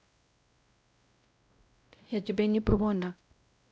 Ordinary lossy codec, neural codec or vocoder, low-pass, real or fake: none; codec, 16 kHz, 0.5 kbps, X-Codec, WavLM features, trained on Multilingual LibriSpeech; none; fake